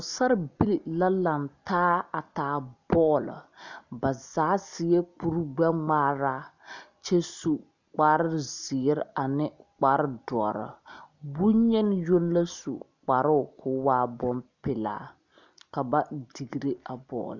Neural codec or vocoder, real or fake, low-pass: none; real; 7.2 kHz